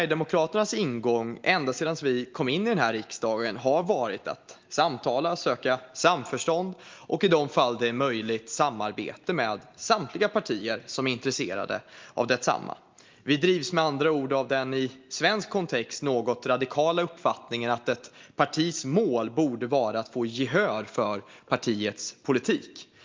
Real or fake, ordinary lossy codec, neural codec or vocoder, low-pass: real; Opus, 32 kbps; none; 7.2 kHz